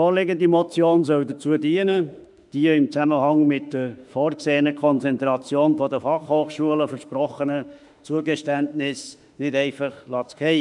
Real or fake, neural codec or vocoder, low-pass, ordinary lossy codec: fake; autoencoder, 48 kHz, 32 numbers a frame, DAC-VAE, trained on Japanese speech; 10.8 kHz; none